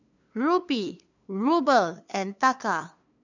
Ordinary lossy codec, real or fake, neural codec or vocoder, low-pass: none; fake; codec, 16 kHz, 2 kbps, FunCodec, trained on LibriTTS, 25 frames a second; 7.2 kHz